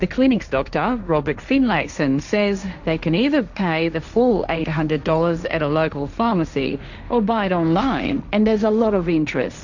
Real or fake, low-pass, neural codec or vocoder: fake; 7.2 kHz; codec, 16 kHz, 1.1 kbps, Voila-Tokenizer